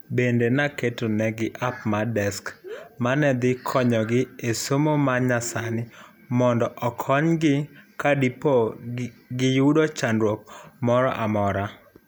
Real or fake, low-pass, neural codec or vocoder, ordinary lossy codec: real; none; none; none